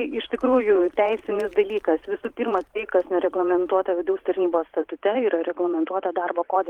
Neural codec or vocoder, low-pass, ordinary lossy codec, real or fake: vocoder, 44.1 kHz, 128 mel bands every 512 samples, BigVGAN v2; 19.8 kHz; Opus, 24 kbps; fake